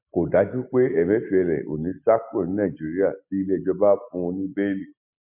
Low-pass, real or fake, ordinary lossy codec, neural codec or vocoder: 3.6 kHz; real; MP3, 32 kbps; none